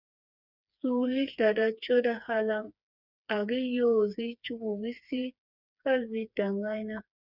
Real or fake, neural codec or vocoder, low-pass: fake; codec, 16 kHz, 4 kbps, FreqCodec, smaller model; 5.4 kHz